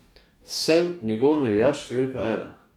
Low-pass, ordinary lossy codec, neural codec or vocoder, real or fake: 19.8 kHz; none; codec, 44.1 kHz, 2.6 kbps, DAC; fake